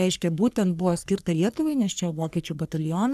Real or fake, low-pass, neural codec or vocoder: fake; 14.4 kHz; codec, 44.1 kHz, 3.4 kbps, Pupu-Codec